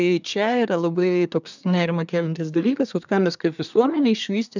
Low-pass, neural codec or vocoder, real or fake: 7.2 kHz; codec, 24 kHz, 1 kbps, SNAC; fake